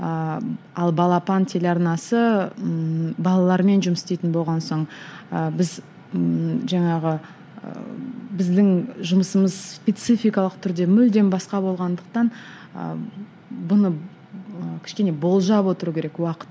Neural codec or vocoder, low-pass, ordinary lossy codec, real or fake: none; none; none; real